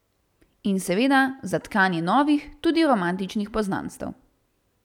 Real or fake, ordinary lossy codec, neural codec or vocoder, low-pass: real; none; none; 19.8 kHz